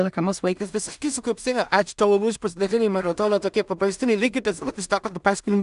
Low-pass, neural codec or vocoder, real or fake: 10.8 kHz; codec, 16 kHz in and 24 kHz out, 0.4 kbps, LongCat-Audio-Codec, two codebook decoder; fake